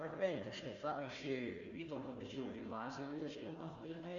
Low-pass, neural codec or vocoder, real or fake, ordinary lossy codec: 7.2 kHz; codec, 16 kHz, 1 kbps, FunCodec, trained on Chinese and English, 50 frames a second; fake; Opus, 64 kbps